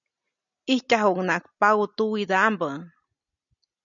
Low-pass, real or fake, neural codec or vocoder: 7.2 kHz; real; none